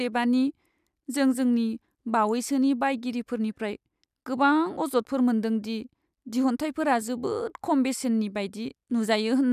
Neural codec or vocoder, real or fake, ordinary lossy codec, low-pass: none; real; none; 14.4 kHz